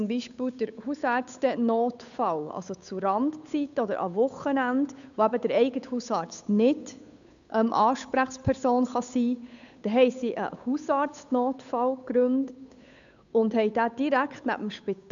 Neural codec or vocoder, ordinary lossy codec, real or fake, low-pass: codec, 16 kHz, 8 kbps, FunCodec, trained on Chinese and English, 25 frames a second; none; fake; 7.2 kHz